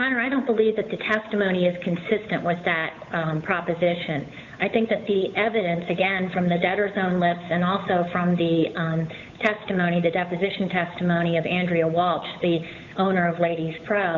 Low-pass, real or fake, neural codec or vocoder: 7.2 kHz; fake; codec, 16 kHz, 8 kbps, FunCodec, trained on Chinese and English, 25 frames a second